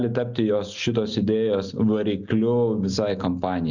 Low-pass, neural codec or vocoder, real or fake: 7.2 kHz; none; real